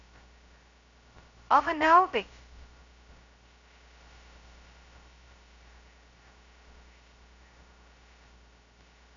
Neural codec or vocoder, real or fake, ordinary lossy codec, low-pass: codec, 16 kHz, 0.2 kbps, FocalCodec; fake; MP3, 64 kbps; 7.2 kHz